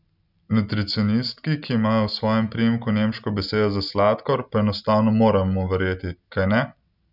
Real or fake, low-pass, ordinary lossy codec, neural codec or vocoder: real; 5.4 kHz; none; none